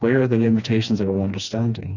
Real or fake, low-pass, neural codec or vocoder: fake; 7.2 kHz; codec, 16 kHz, 2 kbps, FreqCodec, smaller model